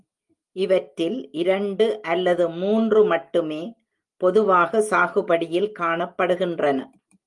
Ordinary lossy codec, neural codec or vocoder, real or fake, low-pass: Opus, 32 kbps; none; real; 10.8 kHz